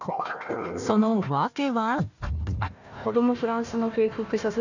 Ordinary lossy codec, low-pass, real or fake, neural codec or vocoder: none; 7.2 kHz; fake; codec, 16 kHz, 1 kbps, FunCodec, trained on Chinese and English, 50 frames a second